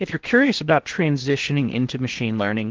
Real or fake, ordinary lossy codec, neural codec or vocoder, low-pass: fake; Opus, 16 kbps; codec, 16 kHz in and 24 kHz out, 0.6 kbps, FocalCodec, streaming, 2048 codes; 7.2 kHz